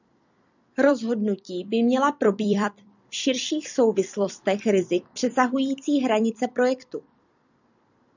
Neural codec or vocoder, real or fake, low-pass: vocoder, 44.1 kHz, 128 mel bands every 256 samples, BigVGAN v2; fake; 7.2 kHz